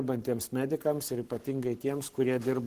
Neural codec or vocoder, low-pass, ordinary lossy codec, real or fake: codec, 44.1 kHz, 7.8 kbps, Pupu-Codec; 14.4 kHz; Opus, 16 kbps; fake